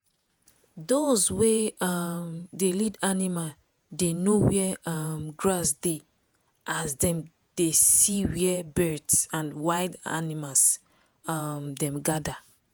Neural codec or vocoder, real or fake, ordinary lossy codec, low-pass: vocoder, 48 kHz, 128 mel bands, Vocos; fake; none; none